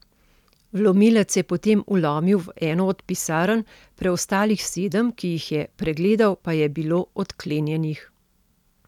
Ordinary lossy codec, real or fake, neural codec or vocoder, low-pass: none; real; none; 19.8 kHz